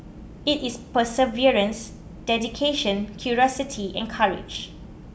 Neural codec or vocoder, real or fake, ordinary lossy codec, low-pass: none; real; none; none